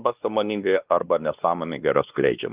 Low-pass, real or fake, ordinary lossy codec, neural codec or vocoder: 3.6 kHz; fake; Opus, 32 kbps; codec, 16 kHz, 2 kbps, X-Codec, WavLM features, trained on Multilingual LibriSpeech